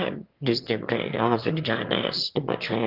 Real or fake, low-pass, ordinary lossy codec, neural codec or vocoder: fake; 5.4 kHz; Opus, 32 kbps; autoencoder, 22.05 kHz, a latent of 192 numbers a frame, VITS, trained on one speaker